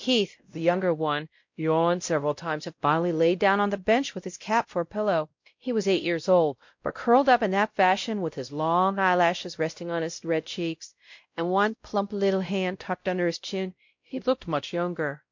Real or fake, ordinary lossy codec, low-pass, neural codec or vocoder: fake; MP3, 48 kbps; 7.2 kHz; codec, 16 kHz, 0.5 kbps, X-Codec, WavLM features, trained on Multilingual LibriSpeech